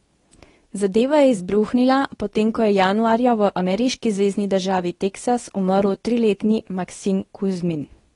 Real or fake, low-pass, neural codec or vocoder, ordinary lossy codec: fake; 10.8 kHz; codec, 24 kHz, 0.9 kbps, WavTokenizer, medium speech release version 2; AAC, 32 kbps